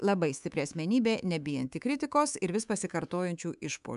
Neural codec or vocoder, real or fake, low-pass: codec, 24 kHz, 3.1 kbps, DualCodec; fake; 10.8 kHz